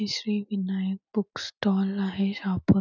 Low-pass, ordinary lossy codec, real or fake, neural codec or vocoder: 7.2 kHz; none; real; none